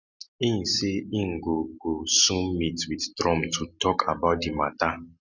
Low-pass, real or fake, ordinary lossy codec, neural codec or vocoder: 7.2 kHz; real; none; none